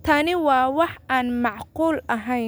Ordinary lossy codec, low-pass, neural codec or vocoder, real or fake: none; none; none; real